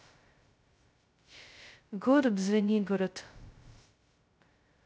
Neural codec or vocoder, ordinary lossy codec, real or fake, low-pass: codec, 16 kHz, 0.2 kbps, FocalCodec; none; fake; none